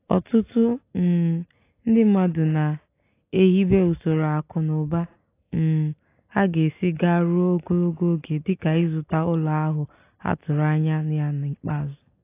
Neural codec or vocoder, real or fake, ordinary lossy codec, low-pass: none; real; AAC, 24 kbps; 3.6 kHz